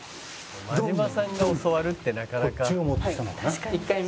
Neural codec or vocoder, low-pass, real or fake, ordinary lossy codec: none; none; real; none